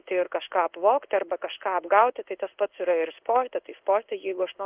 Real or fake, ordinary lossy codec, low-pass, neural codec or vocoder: fake; Opus, 32 kbps; 3.6 kHz; codec, 16 kHz in and 24 kHz out, 1 kbps, XY-Tokenizer